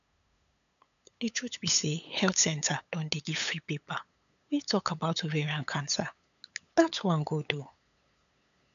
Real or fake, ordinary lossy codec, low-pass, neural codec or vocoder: fake; none; 7.2 kHz; codec, 16 kHz, 8 kbps, FunCodec, trained on LibriTTS, 25 frames a second